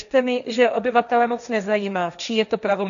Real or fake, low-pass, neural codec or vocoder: fake; 7.2 kHz; codec, 16 kHz, 1.1 kbps, Voila-Tokenizer